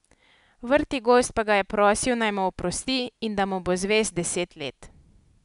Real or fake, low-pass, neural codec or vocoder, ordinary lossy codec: real; 10.8 kHz; none; none